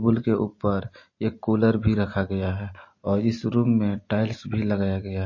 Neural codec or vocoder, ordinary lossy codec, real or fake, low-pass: none; MP3, 32 kbps; real; 7.2 kHz